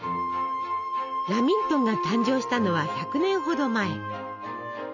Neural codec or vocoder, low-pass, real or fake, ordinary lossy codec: none; 7.2 kHz; real; none